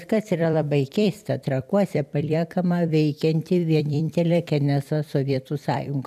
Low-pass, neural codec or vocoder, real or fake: 14.4 kHz; vocoder, 44.1 kHz, 128 mel bands every 256 samples, BigVGAN v2; fake